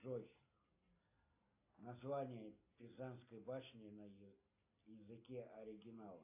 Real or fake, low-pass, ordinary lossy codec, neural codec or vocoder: real; 3.6 kHz; AAC, 24 kbps; none